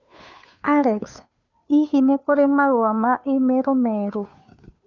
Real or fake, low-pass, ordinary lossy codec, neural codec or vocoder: fake; 7.2 kHz; none; codec, 16 kHz, 2 kbps, FunCodec, trained on Chinese and English, 25 frames a second